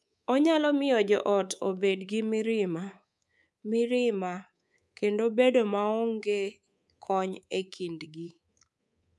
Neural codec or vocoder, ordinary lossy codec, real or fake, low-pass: codec, 24 kHz, 3.1 kbps, DualCodec; none; fake; none